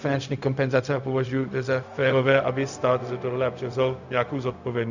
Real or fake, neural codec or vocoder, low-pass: fake; codec, 16 kHz, 0.4 kbps, LongCat-Audio-Codec; 7.2 kHz